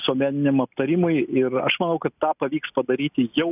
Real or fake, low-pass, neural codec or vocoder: real; 3.6 kHz; none